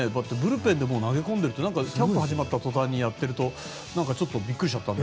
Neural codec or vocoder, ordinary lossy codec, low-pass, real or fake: none; none; none; real